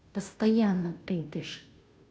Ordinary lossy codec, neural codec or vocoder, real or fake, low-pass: none; codec, 16 kHz, 0.5 kbps, FunCodec, trained on Chinese and English, 25 frames a second; fake; none